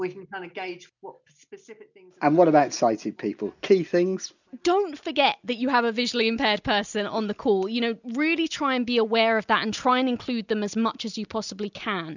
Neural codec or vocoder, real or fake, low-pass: none; real; 7.2 kHz